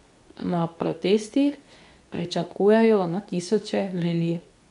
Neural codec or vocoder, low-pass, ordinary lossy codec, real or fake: codec, 24 kHz, 0.9 kbps, WavTokenizer, medium speech release version 2; 10.8 kHz; none; fake